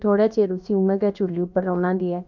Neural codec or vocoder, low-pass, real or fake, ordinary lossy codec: codec, 16 kHz, about 1 kbps, DyCAST, with the encoder's durations; 7.2 kHz; fake; none